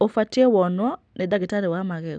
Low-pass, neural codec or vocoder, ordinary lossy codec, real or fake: 9.9 kHz; none; none; real